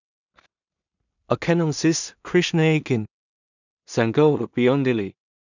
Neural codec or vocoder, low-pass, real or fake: codec, 16 kHz in and 24 kHz out, 0.4 kbps, LongCat-Audio-Codec, two codebook decoder; 7.2 kHz; fake